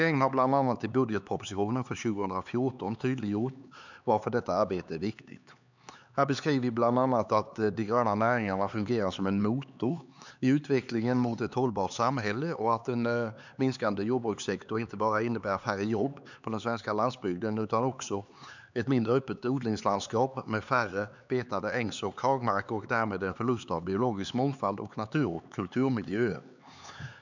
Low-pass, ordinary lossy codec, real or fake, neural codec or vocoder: 7.2 kHz; none; fake; codec, 16 kHz, 4 kbps, X-Codec, HuBERT features, trained on LibriSpeech